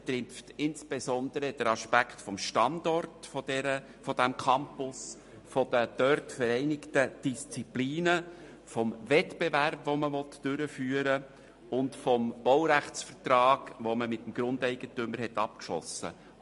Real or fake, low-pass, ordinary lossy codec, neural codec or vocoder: real; 14.4 kHz; MP3, 48 kbps; none